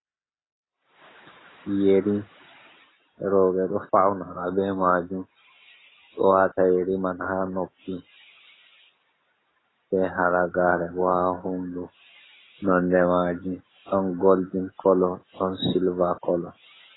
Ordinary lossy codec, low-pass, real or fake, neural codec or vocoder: AAC, 16 kbps; 7.2 kHz; real; none